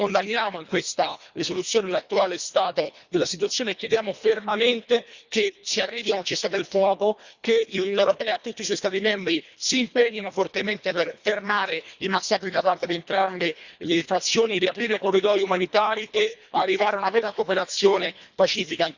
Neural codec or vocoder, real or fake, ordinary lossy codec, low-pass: codec, 24 kHz, 1.5 kbps, HILCodec; fake; Opus, 64 kbps; 7.2 kHz